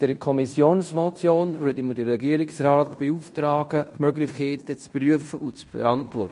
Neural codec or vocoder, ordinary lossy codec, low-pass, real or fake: codec, 16 kHz in and 24 kHz out, 0.9 kbps, LongCat-Audio-Codec, fine tuned four codebook decoder; MP3, 48 kbps; 10.8 kHz; fake